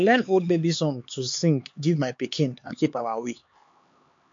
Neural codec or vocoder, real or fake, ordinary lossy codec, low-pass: codec, 16 kHz, 4 kbps, X-Codec, HuBERT features, trained on LibriSpeech; fake; MP3, 48 kbps; 7.2 kHz